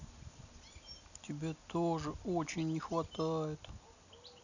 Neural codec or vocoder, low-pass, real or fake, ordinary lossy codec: none; 7.2 kHz; real; none